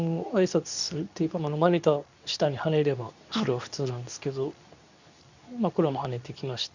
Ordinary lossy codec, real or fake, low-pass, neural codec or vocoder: none; fake; 7.2 kHz; codec, 24 kHz, 0.9 kbps, WavTokenizer, medium speech release version 2